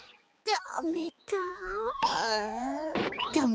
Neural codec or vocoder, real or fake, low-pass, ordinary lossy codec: codec, 16 kHz, 4 kbps, X-Codec, HuBERT features, trained on balanced general audio; fake; none; none